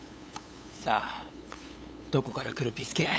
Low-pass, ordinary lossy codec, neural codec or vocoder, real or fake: none; none; codec, 16 kHz, 8 kbps, FunCodec, trained on LibriTTS, 25 frames a second; fake